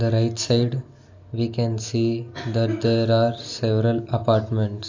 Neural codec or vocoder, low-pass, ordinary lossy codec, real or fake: none; 7.2 kHz; AAC, 48 kbps; real